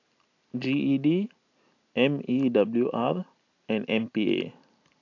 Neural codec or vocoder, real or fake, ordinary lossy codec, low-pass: none; real; AAC, 48 kbps; 7.2 kHz